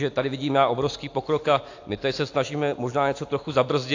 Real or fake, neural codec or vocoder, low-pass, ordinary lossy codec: real; none; 7.2 kHz; AAC, 48 kbps